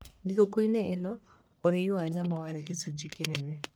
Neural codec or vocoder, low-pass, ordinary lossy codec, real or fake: codec, 44.1 kHz, 1.7 kbps, Pupu-Codec; none; none; fake